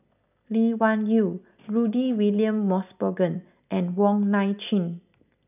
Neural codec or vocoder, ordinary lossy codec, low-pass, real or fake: none; none; 3.6 kHz; real